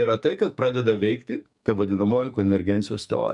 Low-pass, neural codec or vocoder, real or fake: 10.8 kHz; codec, 44.1 kHz, 2.6 kbps, SNAC; fake